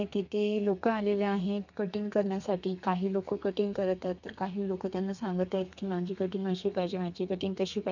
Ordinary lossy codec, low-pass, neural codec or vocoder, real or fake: none; 7.2 kHz; codec, 32 kHz, 1.9 kbps, SNAC; fake